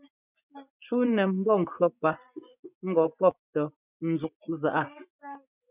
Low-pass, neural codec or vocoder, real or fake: 3.6 kHz; vocoder, 24 kHz, 100 mel bands, Vocos; fake